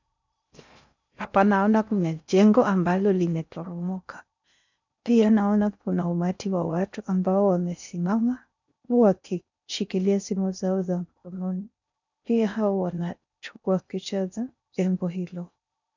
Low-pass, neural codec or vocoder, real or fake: 7.2 kHz; codec, 16 kHz in and 24 kHz out, 0.6 kbps, FocalCodec, streaming, 4096 codes; fake